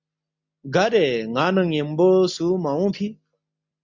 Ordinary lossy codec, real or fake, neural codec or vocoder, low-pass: MP3, 64 kbps; real; none; 7.2 kHz